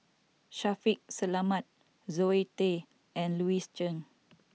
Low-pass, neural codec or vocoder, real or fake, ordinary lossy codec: none; none; real; none